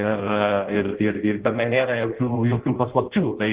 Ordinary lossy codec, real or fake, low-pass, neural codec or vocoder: Opus, 16 kbps; fake; 3.6 kHz; codec, 16 kHz in and 24 kHz out, 0.6 kbps, FireRedTTS-2 codec